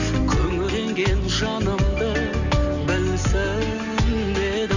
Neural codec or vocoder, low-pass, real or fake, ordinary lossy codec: none; 7.2 kHz; real; Opus, 64 kbps